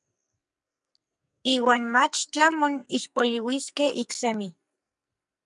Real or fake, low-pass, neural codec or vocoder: fake; 10.8 kHz; codec, 44.1 kHz, 2.6 kbps, SNAC